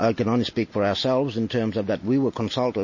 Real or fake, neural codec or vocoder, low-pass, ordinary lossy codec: real; none; 7.2 kHz; MP3, 32 kbps